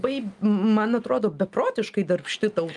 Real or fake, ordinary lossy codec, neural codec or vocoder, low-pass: real; Opus, 32 kbps; none; 10.8 kHz